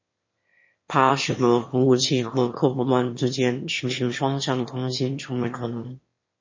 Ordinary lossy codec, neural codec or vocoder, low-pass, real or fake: MP3, 32 kbps; autoencoder, 22.05 kHz, a latent of 192 numbers a frame, VITS, trained on one speaker; 7.2 kHz; fake